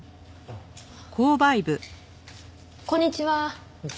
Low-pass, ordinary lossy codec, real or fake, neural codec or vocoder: none; none; real; none